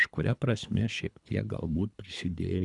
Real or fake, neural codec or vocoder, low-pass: fake; codec, 24 kHz, 3 kbps, HILCodec; 10.8 kHz